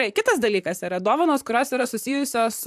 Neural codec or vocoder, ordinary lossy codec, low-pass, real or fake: vocoder, 44.1 kHz, 128 mel bands, Pupu-Vocoder; AAC, 96 kbps; 14.4 kHz; fake